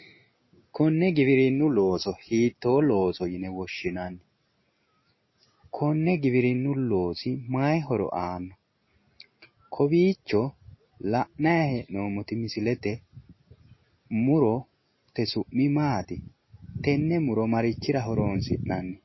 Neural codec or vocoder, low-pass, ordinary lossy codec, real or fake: none; 7.2 kHz; MP3, 24 kbps; real